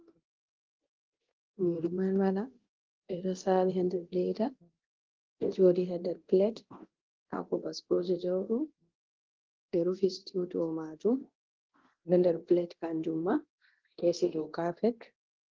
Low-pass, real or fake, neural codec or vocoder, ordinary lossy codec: 7.2 kHz; fake; codec, 24 kHz, 0.9 kbps, DualCodec; Opus, 16 kbps